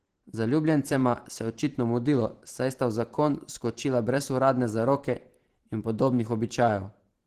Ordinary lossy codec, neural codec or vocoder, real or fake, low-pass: Opus, 16 kbps; vocoder, 44.1 kHz, 128 mel bands every 512 samples, BigVGAN v2; fake; 14.4 kHz